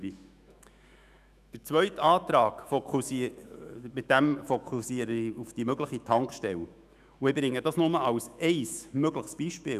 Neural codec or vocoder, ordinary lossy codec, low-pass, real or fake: autoencoder, 48 kHz, 128 numbers a frame, DAC-VAE, trained on Japanese speech; none; 14.4 kHz; fake